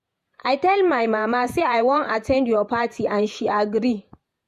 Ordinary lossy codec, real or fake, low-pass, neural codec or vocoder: MP3, 64 kbps; fake; 14.4 kHz; vocoder, 48 kHz, 128 mel bands, Vocos